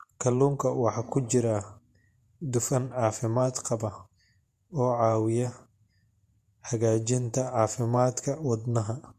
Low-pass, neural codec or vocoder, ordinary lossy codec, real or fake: 14.4 kHz; vocoder, 48 kHz, 128 mel bands, Vocos; MP3, 64 kbps; fake